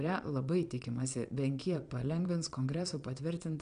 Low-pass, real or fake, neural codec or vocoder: 9.9 kHz; fake; vocoder, 22.05 kHz, 80 mel bands, Vocos